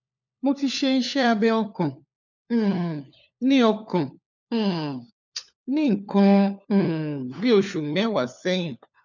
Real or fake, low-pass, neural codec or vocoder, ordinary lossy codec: fake; 7.2 kHz; codec, 16 kHz, 4 kbps, FunCodec, trained on LibriTTS, 50 frames a second; none